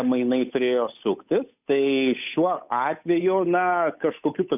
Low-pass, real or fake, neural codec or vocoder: 3.6 kHz; fake; codec, 16 kHz, 8 kbps, FunCodec, trained on Chinese and English, 25 frames a second